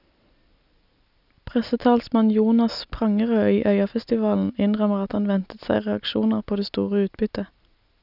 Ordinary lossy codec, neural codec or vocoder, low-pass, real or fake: none; none; 5.4 kHz; real